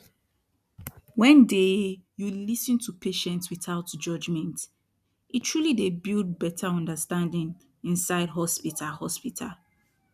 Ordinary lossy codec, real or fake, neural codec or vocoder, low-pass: none; real; none; 14.4 kHz